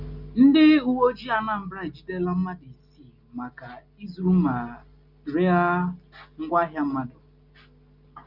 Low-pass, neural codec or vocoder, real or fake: 5.4 kHz; none; real